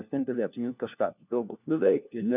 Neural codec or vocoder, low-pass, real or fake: codec, 16 kHz, 1 kbps, FunCodec, trained on LibriTTS, 50 frames a second; 3.6 kHz; fake